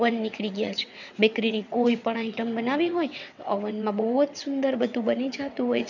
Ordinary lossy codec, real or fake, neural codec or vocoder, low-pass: none; fake; vocoder, 22.05 kHz, 80 mel bands, WaveNeXt; 7.2 kHz